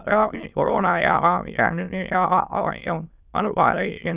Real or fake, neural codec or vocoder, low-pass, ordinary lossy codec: fake; autoencoder, 22.05 kHz, a latent of 192 numbers a frame, VITS, trained on many speakers; 3.6 kHz; Opus, 64 kbps